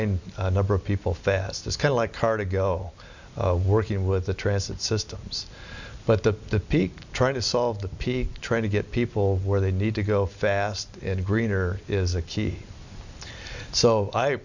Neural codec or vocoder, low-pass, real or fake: none; 7.2 kHz; real